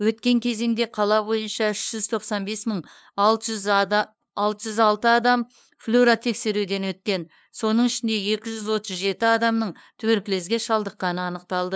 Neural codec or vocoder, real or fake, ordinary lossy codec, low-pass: codec, 16 kHz, 2 kbps, FunCodec, trained on LibriTTS, 25 frames a second; fake; none; none